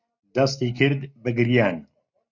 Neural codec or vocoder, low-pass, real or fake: none; 7.2 kHz; real